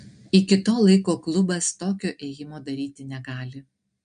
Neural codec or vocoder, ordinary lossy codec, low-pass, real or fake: none; MP3, 64 kbps; 9.9 kHz; real